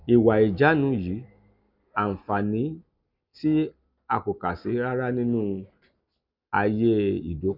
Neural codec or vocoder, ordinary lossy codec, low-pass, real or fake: none; none; 5.4 kHz; real